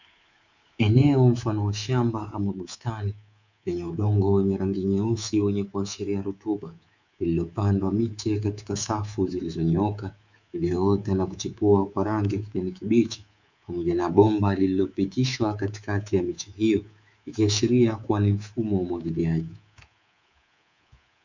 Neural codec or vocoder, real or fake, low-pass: codec, 24 kHz, 3.1 kbps, DualCodec; fake; 7.2 kHz